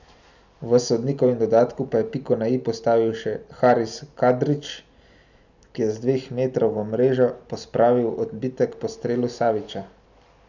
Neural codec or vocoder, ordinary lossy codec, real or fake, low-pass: none; none; real; 7.2 kHz